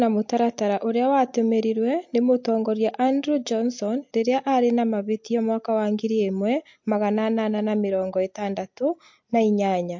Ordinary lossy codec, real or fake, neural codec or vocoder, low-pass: MP3, 48 kbps; real; none; 7.2 kHz